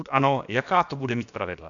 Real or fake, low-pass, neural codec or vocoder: fake; 7.2 kHz; codec, 16 kHz, about 1 kbps, DyCAST, with the encoder's durations